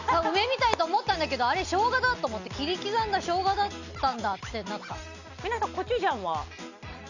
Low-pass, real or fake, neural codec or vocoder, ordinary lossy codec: 7.2 kHz; real; none; none